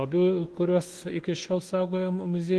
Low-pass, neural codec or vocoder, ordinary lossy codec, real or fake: 10.8 kHz; codec, 24 kHz, 1.2 kbps, DualCodec; Opus, 16 kbps; fake